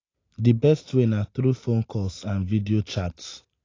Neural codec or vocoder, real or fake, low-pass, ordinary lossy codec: none; real; 7.2 kHz; AAC, 32 kbps